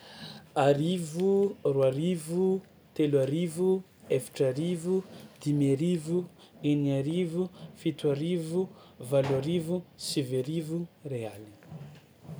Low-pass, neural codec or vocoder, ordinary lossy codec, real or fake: none; none; none; real